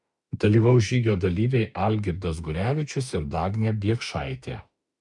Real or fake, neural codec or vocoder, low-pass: fake; autoencoder, 48 kHz, 32 numbers a frame, DAC-VAE, trained on Japanese speech; 10.8 kHz